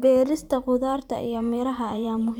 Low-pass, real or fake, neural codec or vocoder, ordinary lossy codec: 19.8 kHz; fake; vocoder, 44.1 kHz, 128 mel bands every 512 samples, BigVGAN v2; none